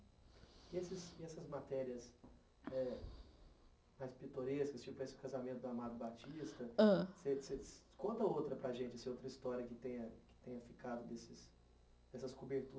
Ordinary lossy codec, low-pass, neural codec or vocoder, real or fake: none; none; none; real